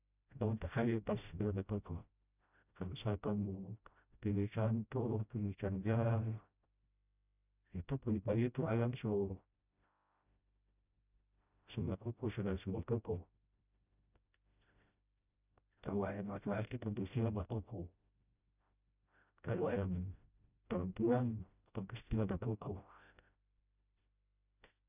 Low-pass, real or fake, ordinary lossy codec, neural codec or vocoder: 3.6 kHz; fake; none; codec, 16 kHz, 0.5 kbps, FreqCodec, smaller model